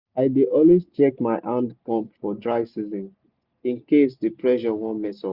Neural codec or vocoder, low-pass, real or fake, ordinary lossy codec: none; 5.4 kHz; real; Opus, 64 kbps